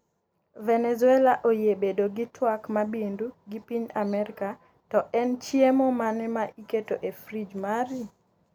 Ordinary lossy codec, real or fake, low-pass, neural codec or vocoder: none; real; 19.8 kHz; none